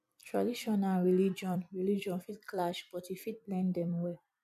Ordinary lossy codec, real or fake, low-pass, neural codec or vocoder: none; fake; 14.4 kHz; vocoder, 44.1 kHz, 128 mel bands every 256 samples, BigVGAN v2